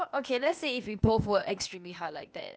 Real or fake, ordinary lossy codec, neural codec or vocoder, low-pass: fake; none; codec, 16 kHz, 0.8 kbps, ZipCodec; none